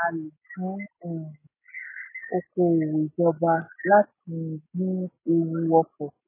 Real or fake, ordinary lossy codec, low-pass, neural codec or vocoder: real; MP3, 16 kbps; 3.6 kHz; none